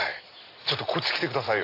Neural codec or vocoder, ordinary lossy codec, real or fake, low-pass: none; AAC, 32 kbps; real; 5.4 kHz